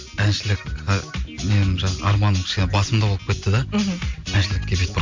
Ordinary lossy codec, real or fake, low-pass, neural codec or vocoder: MP3, 48 kbps; real; 7.2 kHz; none